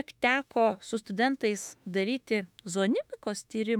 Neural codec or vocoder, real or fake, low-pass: autoencoder, 48 kHz, 32 numbers a frame, DAC-VAE, trained on Japanese speech; fake; 19.8 kHz